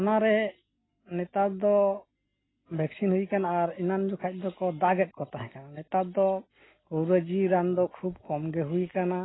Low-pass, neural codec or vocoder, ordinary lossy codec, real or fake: 7.2 kHz; none; AAC, 16 kbps; real